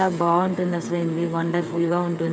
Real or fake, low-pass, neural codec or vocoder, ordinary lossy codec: fake; none; codec, 16 kHz, 8 kbps, FreqCodec, smaller model; none